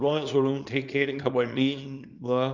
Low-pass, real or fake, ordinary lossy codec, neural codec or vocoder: 7.2 kHz; fake; none; codec, 24 kHz, 0.9 kbps, WavTokenizer, small release